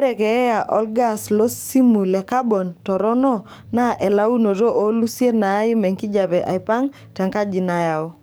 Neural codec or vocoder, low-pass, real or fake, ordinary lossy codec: codec, 44.1 kHz, 7.8 kbps, DAC; none; fake; none